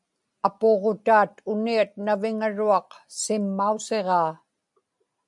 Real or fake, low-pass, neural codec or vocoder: real; 10.8 kHz; none